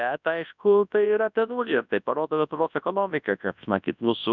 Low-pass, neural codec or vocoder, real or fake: 7.2 kHz; codec, 24 kHz, 0.9 kbps, WavTokenizer, large speech release; fake